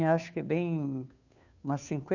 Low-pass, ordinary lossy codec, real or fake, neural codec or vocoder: 7.2 kHz; none; fake; codec, 16 kHz, 2 kbps, FunCodec, trained on Chinese and English, 25 frames a second